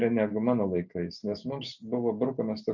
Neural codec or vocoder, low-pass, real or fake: none; 7.2 kHz; real